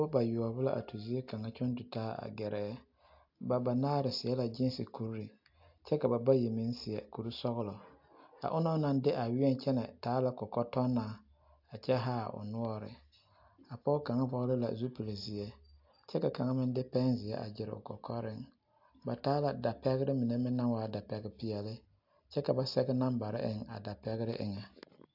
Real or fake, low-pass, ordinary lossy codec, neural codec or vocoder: real; 5.4 kHz; AAC, 48 kbps; none